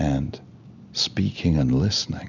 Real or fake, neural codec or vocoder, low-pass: real; none; 7.2 kHz